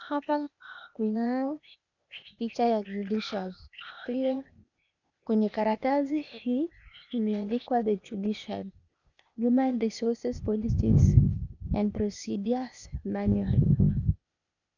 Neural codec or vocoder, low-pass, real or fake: codec, 16 kHz, 0.8 kbps, ZipCodec; 7.2 kHz; fake